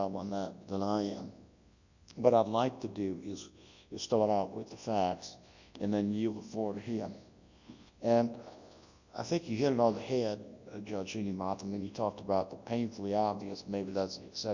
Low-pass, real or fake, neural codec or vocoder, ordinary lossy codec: 7.2 kHz; fake; codec, 24 kHz, 0.9 kbps, WavTokenizer, large speech release; Opus, 64 kbps